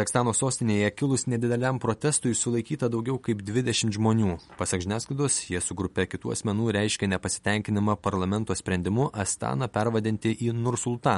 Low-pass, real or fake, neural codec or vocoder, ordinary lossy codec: 19.8 kHz; real; none; MP3, 48 kbps